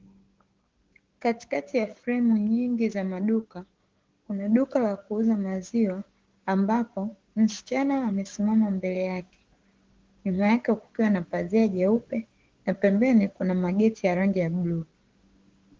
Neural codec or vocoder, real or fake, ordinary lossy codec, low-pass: codec, 16 kHz, 6 kbps, DAC; fake; Opus, 16 kbps; 7.2 kHz